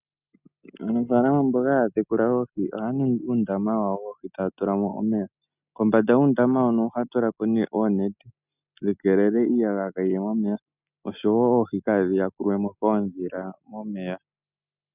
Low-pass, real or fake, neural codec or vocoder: 3.6 kHz; real; none